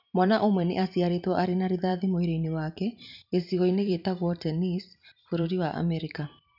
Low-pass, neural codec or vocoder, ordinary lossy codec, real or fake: 5.4 kHz; vocoder, 44.1 kHz, 128 mel bands every 512 samples, BigVGAN v2; none; fake